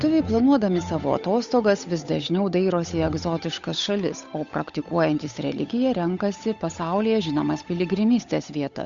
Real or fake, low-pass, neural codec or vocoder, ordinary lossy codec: fake; 7.2 kHz; codec, 16 kHz, 8 kbps, FunCodec, trained on Chinese and English, 25 frames a second; Opus, 64 kbps